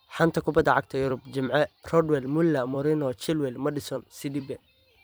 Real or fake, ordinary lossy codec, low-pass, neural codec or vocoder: fake; none; none; vocoder, 44.1 kHz, 128 mel bands every 256 samples, BigVGAN v2